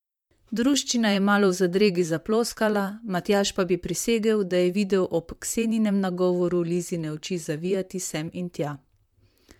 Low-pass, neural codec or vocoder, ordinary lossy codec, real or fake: 19.8 kHz; vocoder, 44.1 kHz, 128 mel bands, Pupu-Vocoder; MP3, 96 kbps; fake